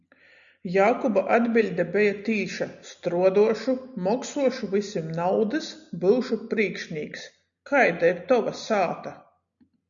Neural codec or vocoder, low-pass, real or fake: none; 7.2 kHz; real